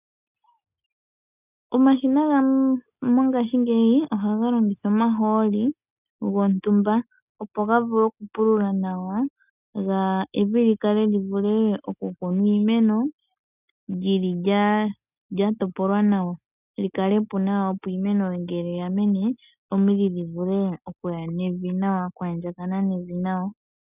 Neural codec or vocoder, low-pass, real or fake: none; 3.6 kHz; real